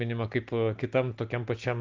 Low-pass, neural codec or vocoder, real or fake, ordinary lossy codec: 7.2 kHz; none; real; Opus, 32 kbps